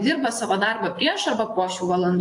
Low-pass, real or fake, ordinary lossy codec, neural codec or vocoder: 10.8 kHz; fake; AAC, 48 kbps; vocoder, 24 kHz, 100 mel bands, Vocos